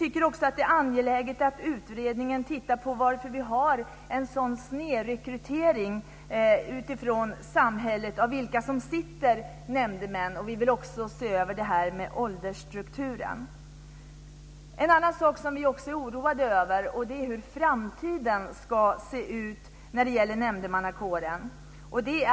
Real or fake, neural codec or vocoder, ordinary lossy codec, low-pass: real; none; none; none